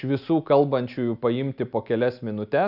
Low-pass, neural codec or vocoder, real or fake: 5.4 kHz; none; real